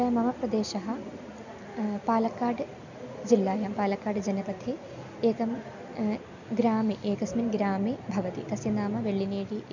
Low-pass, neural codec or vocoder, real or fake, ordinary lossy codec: 7.2 kHz; none; real; none